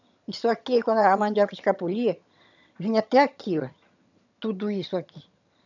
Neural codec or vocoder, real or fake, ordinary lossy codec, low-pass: vocoder, 22.05 kHz, 80 mel bands, HiFi-GAN; fake; none; 7.2 kHz